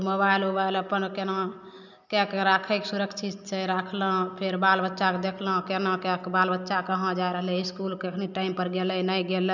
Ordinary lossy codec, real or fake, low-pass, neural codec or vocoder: none; real; 7.2 kHz; none